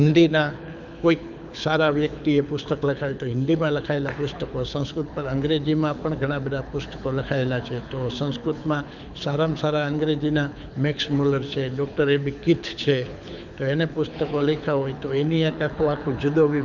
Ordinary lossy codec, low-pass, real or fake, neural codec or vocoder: none; 7.2 kHz; fake; codec, 24 kHz, 6 kbps, HILCodec